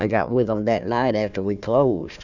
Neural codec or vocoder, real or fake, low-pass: codec, 16 kHz, 1 kbps, FunCodec, trained on Chinese and English, 50 frames a second; fake; 7.2 kHz